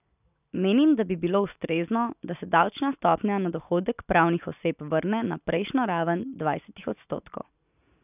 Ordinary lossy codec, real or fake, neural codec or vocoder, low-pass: none; real; none; 3.6 kHz